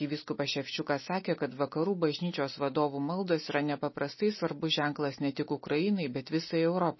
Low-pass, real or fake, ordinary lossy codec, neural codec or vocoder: 7.2 kHz; real; MP3, 24 kbps; none